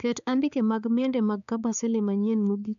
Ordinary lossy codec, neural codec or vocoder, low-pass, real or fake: none; codec, 16 kHz, 4 kbps, X-Codec, HuBERT features, trained on balanced general audio; 7.2 kHz; fake